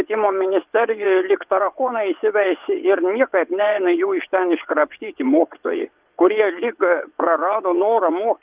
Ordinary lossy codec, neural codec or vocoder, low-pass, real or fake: Opus, 32 kbps; vocoder, 22.05 kHz, 80 mel bands, WaveNeXt; 3.6 kHz; fake